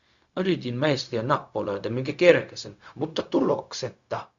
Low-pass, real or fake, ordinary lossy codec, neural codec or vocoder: 7.2 kHz; fake; Opus, 64 kbps; codec, 16 kHz, 0.4 kbps, LongCat-Audio-Codec